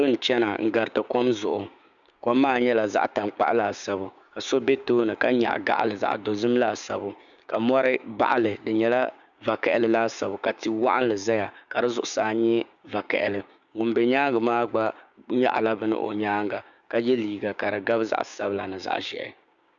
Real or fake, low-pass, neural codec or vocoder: fake; 7.2 kHz; codec, 16 kHz, 6 kbps, DAC